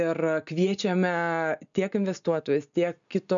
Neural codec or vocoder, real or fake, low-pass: none; real; 7.2 kHz